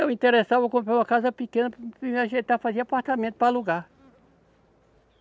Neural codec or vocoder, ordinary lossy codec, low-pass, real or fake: none; none; none; real